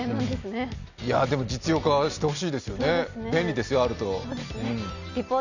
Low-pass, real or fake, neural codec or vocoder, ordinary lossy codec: 7.2 kHz; real; none; none